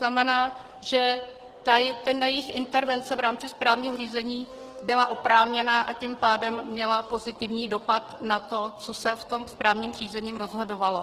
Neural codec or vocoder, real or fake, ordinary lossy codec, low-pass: codec, 44.1 kHz, 2.6 kbps, SNAC; fake; Opus, 16 kbps; 14.4 kHz